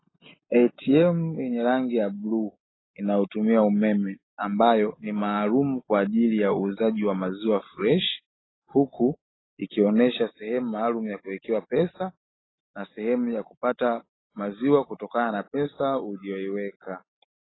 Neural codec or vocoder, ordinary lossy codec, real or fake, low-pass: none; AAC, 16 kbps; real; 7.2 kHz